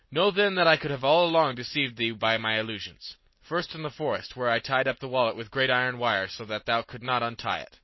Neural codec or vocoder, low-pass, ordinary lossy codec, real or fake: none; 7.2 kHz; MP3, 24 kbps; real